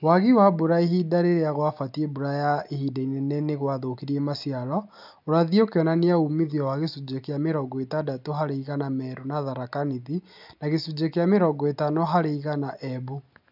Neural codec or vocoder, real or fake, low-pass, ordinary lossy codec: none; real; 5.4 kHz; none